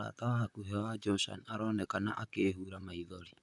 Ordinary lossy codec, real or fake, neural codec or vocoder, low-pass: none; fake; vocoder, 44.1 kHz, 128 mel bands, Pupu-Vocoder; 10.8 kHz